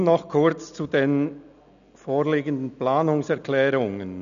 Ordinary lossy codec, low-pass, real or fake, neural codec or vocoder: none; 7.2 kHz; real; none